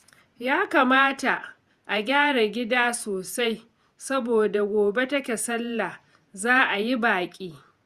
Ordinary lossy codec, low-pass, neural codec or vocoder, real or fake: Opus, 64 kbps; 14.4 kHz; vocoder, 48 kHz, 128 mel bands, Vocos; fake